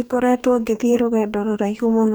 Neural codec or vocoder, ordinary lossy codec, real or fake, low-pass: codec, 44.1 kHz, 2.6 kbps, DAC; none; fake; none